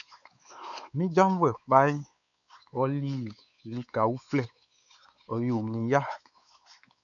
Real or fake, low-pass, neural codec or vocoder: fake; 7.2 kHz; codec, 16 kHz, 4 kbps, X-Codec, WavLM features, trained on Multilingual LibriSpeech